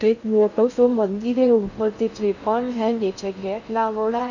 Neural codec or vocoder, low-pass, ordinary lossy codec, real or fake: codec, 16 kHz in and 24 kHz out, 0.6 kbps, FocalCodec, streaming, 2048 codes; 7.2 kHz; none; fake